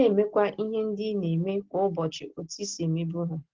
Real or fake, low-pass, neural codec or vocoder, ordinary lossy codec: real; 7.2 kHz; none; Opus, 16 kbps